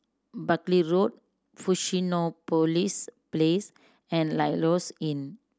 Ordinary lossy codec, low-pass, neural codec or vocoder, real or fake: none; none; none; real